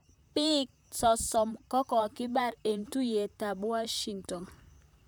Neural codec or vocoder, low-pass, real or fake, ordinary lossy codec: vocoder, 44.1 kHz, 128 mel bands, Pupu-Vocoder; none; fake; none